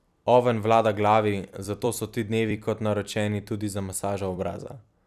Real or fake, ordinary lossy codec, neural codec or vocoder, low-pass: fake; none; vocoder, 44.1 kHz, 128 mel bands every 256 samples, BigVGAN v2; 14.4 kHz